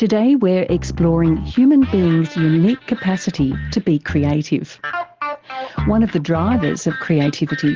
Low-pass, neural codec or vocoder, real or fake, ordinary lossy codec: 7.2 kHz; none; real; Opus, 16 kbps